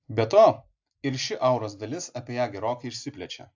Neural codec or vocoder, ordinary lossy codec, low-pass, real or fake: none; AAC, 48 kbps; 7.2 kHz; real